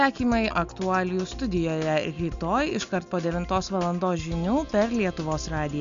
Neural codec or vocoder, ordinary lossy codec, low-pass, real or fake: none; MP3, 64 kbps; 7.2 kHz; real